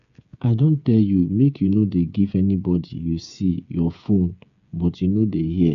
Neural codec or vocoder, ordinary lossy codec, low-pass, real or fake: codec, 16 kHz, 8 kbps, FreqCodec, smaller model; none; 7.2 kHz; fake